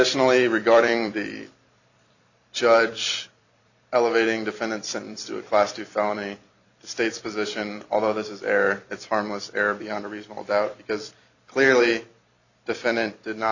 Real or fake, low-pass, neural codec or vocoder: fake; 7.2 kHz; vocoder, 44.1 kHz, 128 mel bands every 256 samples, BigVGAN v2